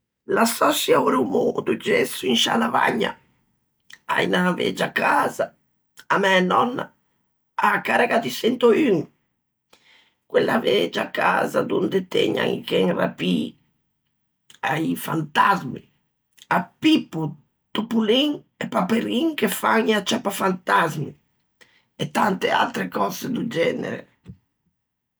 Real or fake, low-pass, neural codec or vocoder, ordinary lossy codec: real; none; none; none